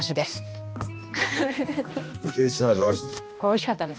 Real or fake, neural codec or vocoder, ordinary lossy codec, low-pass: fake; codec, 16 kHz, 1 kbps, X-Codec, HuBERT features, trained on balanced general audio; none; none